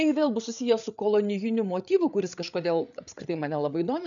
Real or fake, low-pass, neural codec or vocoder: fake; 7.2 kHz; codec, 16 kHz, 8 kbps, FreqCodec, larger model